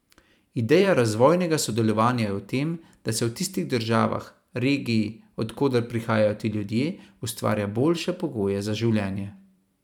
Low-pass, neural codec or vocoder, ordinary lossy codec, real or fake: 19.8 kHz; vocoder, 44.1 kHz, 128 mel bands every 512 samples, BigVGAN v2; none; fake